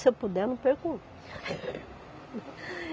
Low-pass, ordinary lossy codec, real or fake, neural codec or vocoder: none; none; real; none